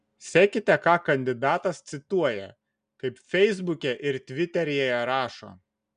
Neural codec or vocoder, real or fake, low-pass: none; real; 9.9 kHz